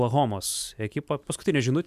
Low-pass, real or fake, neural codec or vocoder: 14.4 kHz; real; none